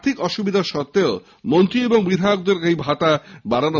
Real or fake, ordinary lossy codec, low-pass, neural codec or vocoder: real; none; 7.2 kHz; none